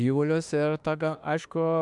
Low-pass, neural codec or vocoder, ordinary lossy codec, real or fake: 10.8 kHz; autoencoder, 48 kHz, 32 numbers a frame, DAC-VAE, trained on Japanese speech; MP3, 96 kbps; fake